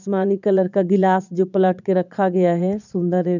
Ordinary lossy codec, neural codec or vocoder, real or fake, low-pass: none; vocoder, 22.05 kHz, 80 mel bands, Vocos; fake; 7.2 kHz